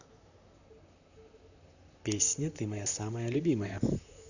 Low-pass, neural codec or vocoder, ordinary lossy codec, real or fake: 7.2 kHz; vocoder, 44.1 kHz, 128 mel bands, Pupu-Vocoder; none; fake